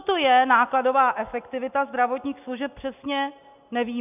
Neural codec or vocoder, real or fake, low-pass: none; real; 3.6 kHz